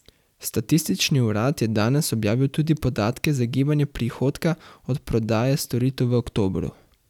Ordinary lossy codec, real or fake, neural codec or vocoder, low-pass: none; real; none; 19.8 kHz